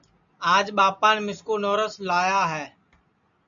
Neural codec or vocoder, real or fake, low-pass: none; real; 7.2 kHz